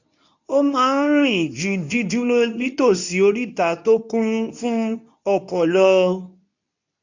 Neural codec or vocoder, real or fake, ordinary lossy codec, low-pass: codec, 24 kHz, 0.9 kbps, WavTokenizer, medium speech release version 2; fake; none; 7.2 kHz